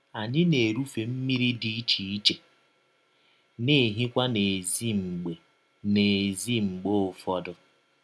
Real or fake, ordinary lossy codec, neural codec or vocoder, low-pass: real; none; none; none